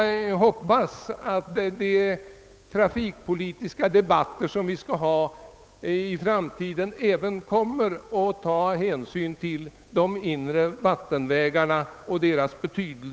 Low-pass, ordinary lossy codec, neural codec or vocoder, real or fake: none; none; codec, 16 kHz, 8 kbps, FunCodec, trained on Chinese and English, 25 frames a second; fake